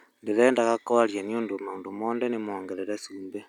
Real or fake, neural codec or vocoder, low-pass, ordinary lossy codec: real; none; 19.8 kHz; none